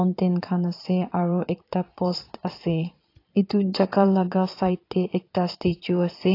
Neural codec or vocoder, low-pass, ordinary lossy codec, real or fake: vocoder, 22.05 kHz, 80 mel bands, Vocos; 5.4 kHz; AAC, 32 kbps; fake